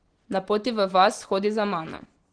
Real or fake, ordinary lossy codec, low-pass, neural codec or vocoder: real; Opus, 16 kbps; 9.9 kHz; none